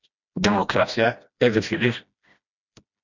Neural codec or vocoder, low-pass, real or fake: codec, 16 kHz, 1 kbps, FreqCodec, smaller model; 7.2 kHz; fake